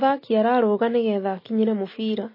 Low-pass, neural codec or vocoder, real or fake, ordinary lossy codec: 5.4 kHz; none; real; MP3, 24 kbps